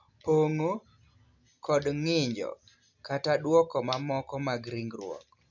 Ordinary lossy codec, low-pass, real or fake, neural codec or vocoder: none; 7.2 kHz; real; none